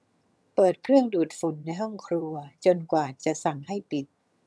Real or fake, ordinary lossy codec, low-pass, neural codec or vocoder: fake; none; none; vocoder, 22.05 kHz, 80 mel bands, HiFi-GAN